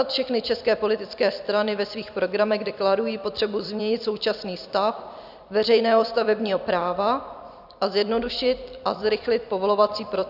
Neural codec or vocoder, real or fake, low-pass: vocoder, 44.1 kHz, 128 mel bands every 256 samples, BigVGAN v2; fake; 5.4 kHz